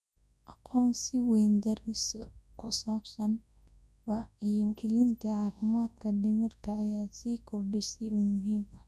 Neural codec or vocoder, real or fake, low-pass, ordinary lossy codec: codec, 24 kHz, 0.9 kbps, WavTokenizer, large speech release; fake; none; none